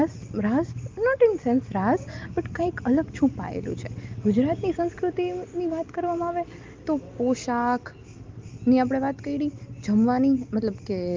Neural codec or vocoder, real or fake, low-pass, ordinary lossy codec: none; real; 7.2 kHz; Opus, 32 kbps